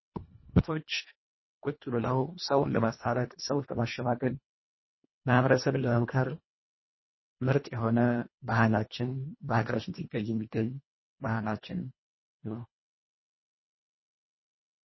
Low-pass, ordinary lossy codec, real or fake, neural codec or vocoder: 7.2 kHz; MP3, 24 kbps; fake; codec, 24 kHz, 1.5 kbps, HILCodec